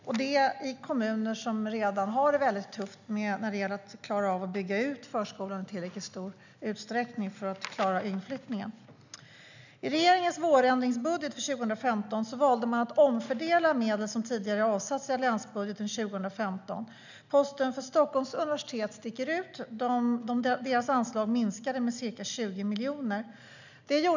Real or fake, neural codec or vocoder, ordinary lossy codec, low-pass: real; none; none; 7.2 kHz